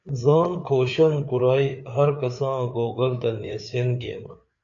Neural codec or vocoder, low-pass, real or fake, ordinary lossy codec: codec, 16 kHz, 4 kbps, FreqCodec, larger model; 7.2 kHz; fake; AAC, 64 kbps